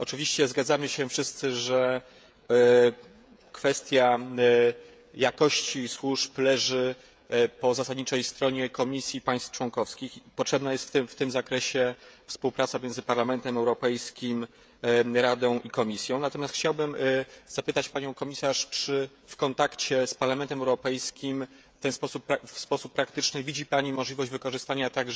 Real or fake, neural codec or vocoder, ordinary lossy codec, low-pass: fake; codec, 16 kHz, 16 kbps, FreqCodec, smaller model; none; none